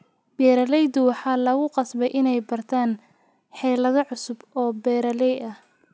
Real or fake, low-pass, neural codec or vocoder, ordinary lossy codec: real; none; none; none